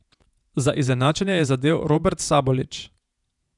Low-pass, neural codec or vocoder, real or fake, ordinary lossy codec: 10.8 kHz; vocoder, 48 kHz, 128 mel bands, Vocos; fake; none